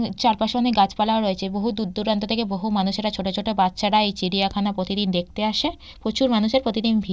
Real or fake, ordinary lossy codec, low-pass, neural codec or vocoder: real; none; none; none